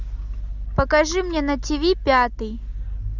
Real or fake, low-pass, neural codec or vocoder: real; 7.2 kHz; none